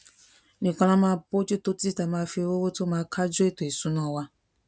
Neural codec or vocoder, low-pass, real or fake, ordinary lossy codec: none; none; real; none